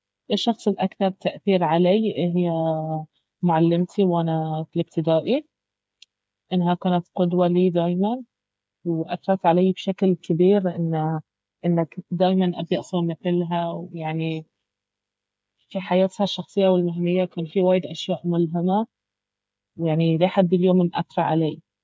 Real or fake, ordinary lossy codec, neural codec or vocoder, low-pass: fake; none; codec, 16 kHz, 8 kbps, FreqCodec, smaller model; none